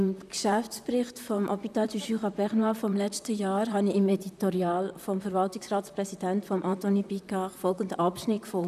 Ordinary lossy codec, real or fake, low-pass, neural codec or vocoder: none; fake; 14.4 kHz; vocoder, 44.1 kHz, 128 mel bands, Pupu-Vocoder